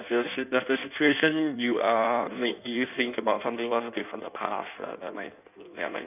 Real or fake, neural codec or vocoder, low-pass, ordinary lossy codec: fake; codec, 16 kHz in and 24 kHz out, 1.1 kbps, FireRedTTS-2 codec; 3.6 kHz; none